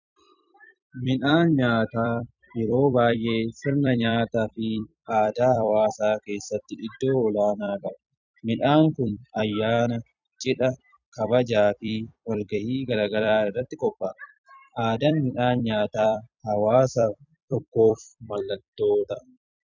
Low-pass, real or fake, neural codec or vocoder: 7.2 kHz; fake; vocoder, 44.1 kHz, 128 mel bands every 256 samples, BigVGAN v2